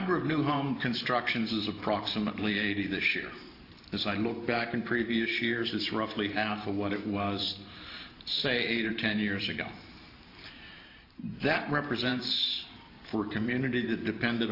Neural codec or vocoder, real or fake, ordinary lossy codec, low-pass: vocoder, 44.1 kHz, 128 mel bands every 512 samples, BigVGAN v2; fake; AAC, 32 kbps; 5.4 kHz